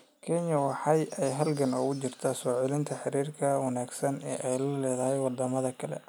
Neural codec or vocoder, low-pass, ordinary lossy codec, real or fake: none; none; none; real